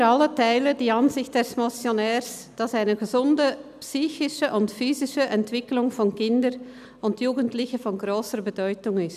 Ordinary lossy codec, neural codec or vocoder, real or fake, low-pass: none; none; real; 14.4 kHz